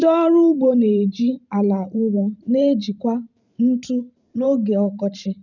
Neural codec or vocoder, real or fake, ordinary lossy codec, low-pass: vocoder, 44.1 kHz, 128 mel bands every 512 samples, BigVGAN v2; fake; none; 7.2 kHz